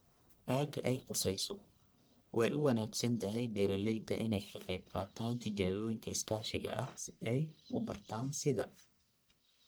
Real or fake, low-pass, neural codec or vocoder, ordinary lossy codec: fake; none; codec, 44.1 kHz, 1.7 kbps, Pupu-Codec; none